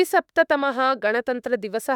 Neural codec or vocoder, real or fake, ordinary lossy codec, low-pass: autoencoder, 48 kHz, 32 numbers a frame, DAC-VAE, trained on Japanese speech; fake; none; 19.8 kHz